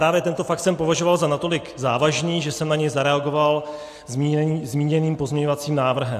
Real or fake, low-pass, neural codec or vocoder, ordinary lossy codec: real; 14.4 kHz; none; AAC, 64 kbps